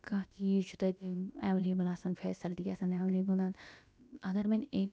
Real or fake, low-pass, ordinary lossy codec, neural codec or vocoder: fake; none; none; codec, 16 kHz, about 1 kbps, DyCAST, with the encoder's durations